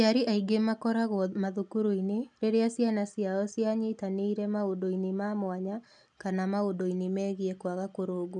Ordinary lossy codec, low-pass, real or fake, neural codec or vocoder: none; 10.8 kHz; real; none